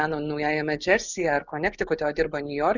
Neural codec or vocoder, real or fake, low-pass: none; real; 7.2 kHz